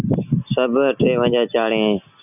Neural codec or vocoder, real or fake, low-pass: none; real; 3.6 kHz